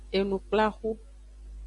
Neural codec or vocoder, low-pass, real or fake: none; 10.8 kHz; real